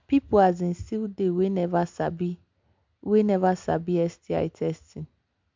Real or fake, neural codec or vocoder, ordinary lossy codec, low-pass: real; none; MP3, 64 kbps; 7.2 kHz